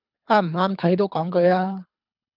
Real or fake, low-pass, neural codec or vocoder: fake; 5.4 kHz; codec, 24 kHz, 3 kbps, HILCodec